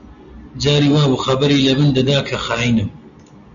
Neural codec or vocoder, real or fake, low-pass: none; real; 7.2 kHz